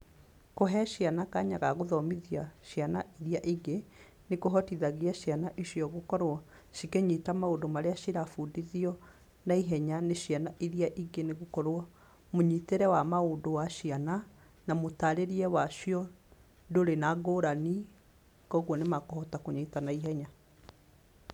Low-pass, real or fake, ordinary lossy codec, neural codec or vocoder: 19.8 kHz; fake; none; vocoder, 44.1 kHz, 128 mel bands every 256 samples, BigVGAN v2